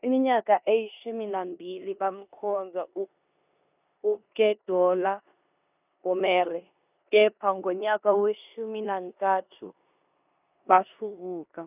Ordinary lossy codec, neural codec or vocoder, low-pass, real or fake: none; codec, 16 kHz in and 24 kHz out, 0.9 kbps, LongCat-Audio-Codec, four codebook decoder; 3.6 kHz; fake